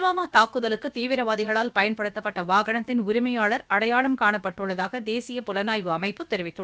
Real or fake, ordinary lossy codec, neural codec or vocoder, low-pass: fake; none; codec, 16 kHz, about 1 kbps, DyCAST, with the encoder's durations; none